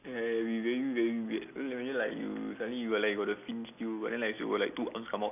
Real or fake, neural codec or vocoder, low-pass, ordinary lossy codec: real; none; 3.6 kHz; none